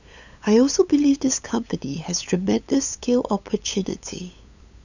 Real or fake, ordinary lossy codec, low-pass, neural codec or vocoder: fake; none; 7.2 kHz; codec, 16 kHz, 8 kbps, FunCodec, trained on LibriTTS, 25 frames a second